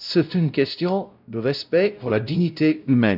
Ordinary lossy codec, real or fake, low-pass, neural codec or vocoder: none; fake; 5.4 kHz; codec, 16 kHz, 0.5 kbps, X-Codec, WavLM features, trained on Multilingual LibriSpeech